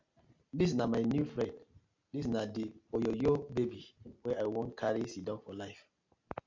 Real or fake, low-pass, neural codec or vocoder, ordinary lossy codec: real; 7.2 kHz; none; AAC, 48 kbps